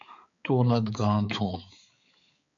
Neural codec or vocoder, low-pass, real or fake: codec, 16 kHz, 4 kbps, X-Codec, HuBERT features, trained on balanced general audio; 7.2 kHz; fake